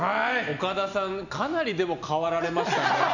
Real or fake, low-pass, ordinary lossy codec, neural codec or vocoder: real; 7.2 kHz; none; none